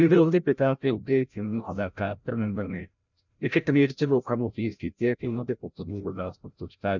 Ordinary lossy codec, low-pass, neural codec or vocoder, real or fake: none; 7.2 kHz; codec, 16 kHz, 0.5 kbps, FreqCodec, larger model; fake